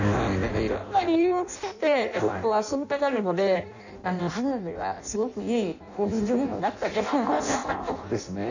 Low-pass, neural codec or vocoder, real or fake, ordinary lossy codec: 7.2 kHz; codec, 16 kHz in and 24 kHz out, 0.6 kbps, FireRedTTS-2 codec; fake; none